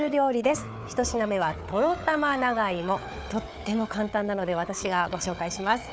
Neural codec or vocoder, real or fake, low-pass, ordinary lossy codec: codec, 16 kHz, 4 kbps, FunCodec, trained on Chinese and English, 50 frames a second; fake; none; none